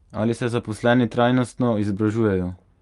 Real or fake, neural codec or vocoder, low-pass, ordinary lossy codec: real; none; 10.8 kHz; Opus, 32 kbps